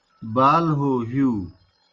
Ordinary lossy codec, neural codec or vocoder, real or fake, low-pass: Opus, 32 kbps; none; real; 7.2 kHz